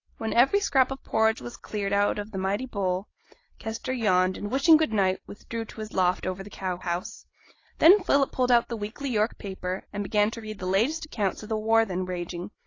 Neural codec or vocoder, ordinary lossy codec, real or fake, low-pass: none; AAC, 32 kbps; real; 7.2 kHz